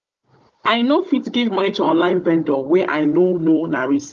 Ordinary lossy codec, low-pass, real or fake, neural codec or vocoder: Opus, 32 kbps; 7.2 kHz; fake; codec, 16 kHz, 16 kbps, FunCodec, trained on Chinese and English, 50 frames a second